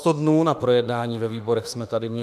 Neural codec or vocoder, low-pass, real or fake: autoencoder, 48 kHz, 32 numbers a frame, DAC-VAE, trained on Japanese speech; 14.4 kHz; fake